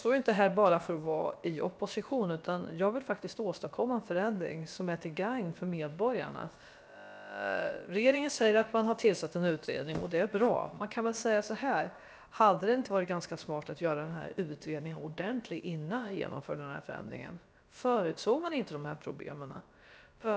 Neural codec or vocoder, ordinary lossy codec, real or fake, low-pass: codec, 16 kHz, about 1 kbps, DyCAST, with the encoder's durations; none; fake; none